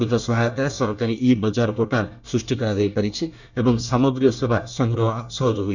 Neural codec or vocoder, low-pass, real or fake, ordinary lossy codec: codec, 24 kHz, 1 kbps, SNAC; 7.2 kHz; fake; none